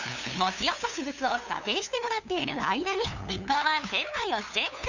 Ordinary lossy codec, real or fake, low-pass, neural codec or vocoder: none; fake; 7.2 kHz; codec, 16 kHz, 2 kbps, FunCodec, trained on LibriTTS, 25 frames a second